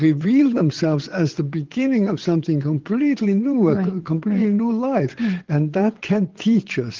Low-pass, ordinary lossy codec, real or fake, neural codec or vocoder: 7.2 kHz; Opus, 16 kbps; fake; vocoder, 22.05 kHz, 80 mel bands, WaveNeXt